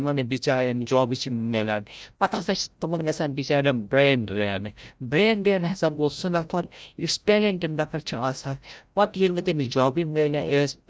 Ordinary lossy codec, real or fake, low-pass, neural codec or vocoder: none; fake; none; codec, 16 kHz, 0.5 kbps, FreqCodec, larger model